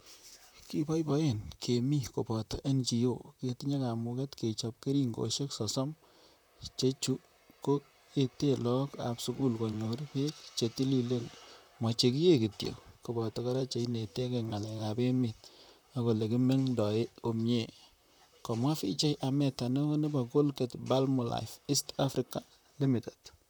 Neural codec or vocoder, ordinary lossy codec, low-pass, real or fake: vocoder, 44.1 kHz, 128 mel bands, Pupu-Vocoder; none; none; fake